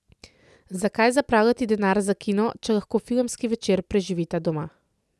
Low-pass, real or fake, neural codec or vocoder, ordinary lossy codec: none; real; none; none